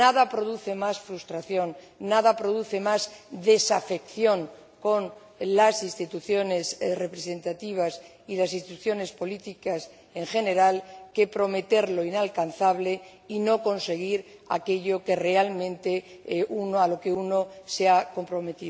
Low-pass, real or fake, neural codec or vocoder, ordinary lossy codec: none; real; none; none